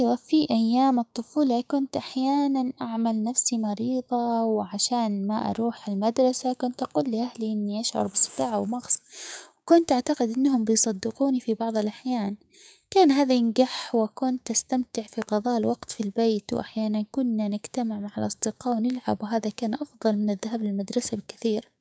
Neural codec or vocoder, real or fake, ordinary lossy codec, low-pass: codec, 16 kHz, 6 kbps, DAC; fake; none; none